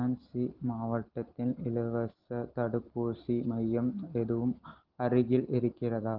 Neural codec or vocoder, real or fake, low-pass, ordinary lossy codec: none; real; 5.4 kHz; Opus, 16 kbps